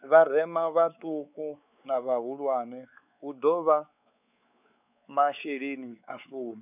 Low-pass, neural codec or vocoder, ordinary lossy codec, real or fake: 3.6 kHz; codec, 16 kHz, 4 kbps, X-Codec, WavLM features, trained on Multilingual LibriSpeech; none; fake